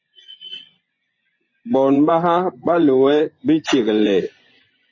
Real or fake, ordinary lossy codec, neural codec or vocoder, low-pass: fake; MP3, 32 kbps; vocoder, 24 kHz, 100 mel bands, Vocos; 7.2 kHz